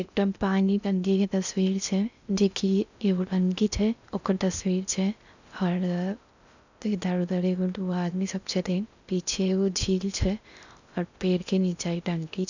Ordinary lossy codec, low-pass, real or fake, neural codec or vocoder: none; 7.2 kHz; fake; codec, 16 kHz in and 24 kHz out, 0.6 kbps, FocalCodec, streaming, 2048 codes